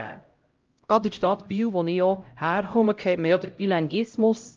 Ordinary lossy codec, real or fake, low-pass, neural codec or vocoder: Opus, 24 kbps; fake; 7.2 kHz; codec, 16 kHz, 0.5 kbps, X-Codec, HuBERT features, trained on LibriSpeech